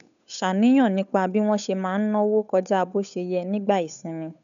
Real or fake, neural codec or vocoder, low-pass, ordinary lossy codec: fake; codec, 16 kHz, 8 kbps, FunCodec, trained on Chinese and English, 25 frames a second; 7.2 kHz; none